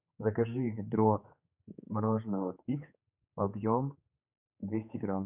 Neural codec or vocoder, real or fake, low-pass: codec, 16 kHz, 4 kbps, X-Codec, HuBERT features, trained on general audio; fake; 3.6 kHz